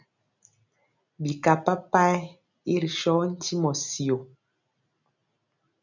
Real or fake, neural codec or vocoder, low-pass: real; none; 7.2 kHz